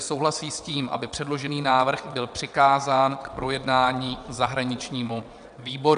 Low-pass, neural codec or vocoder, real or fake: 9.9 kHz; vocoder, 22.05 kHz, 80 mel bands, WaveNeXt; fake